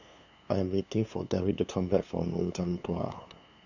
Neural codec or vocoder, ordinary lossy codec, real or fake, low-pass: codec, 16 kHz, 2 kbps, FunCodec, trained on LibriTTS, 25 frames a second; none; fake; 7.2 kHz